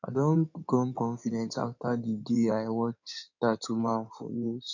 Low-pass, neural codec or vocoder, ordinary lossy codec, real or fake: 7.2 kHz; codec, 16 kHz in and 24 kHz out, 2.2 kbps, FireRedTTS-2 codec; none; fake